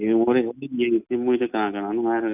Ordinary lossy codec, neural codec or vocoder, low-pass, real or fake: none; none; 3.6 kHz; real